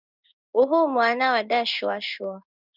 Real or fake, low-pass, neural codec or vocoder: fake; 5.4 kHz; codec, 16 kHz in and 24 kHz out, 1 kbps, XY-Tokenizer